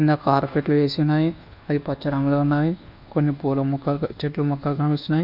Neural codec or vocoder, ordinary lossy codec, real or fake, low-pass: codec, 24 kHz, 1.2 kbps, DualCodec; none; fake; 5.4 kHz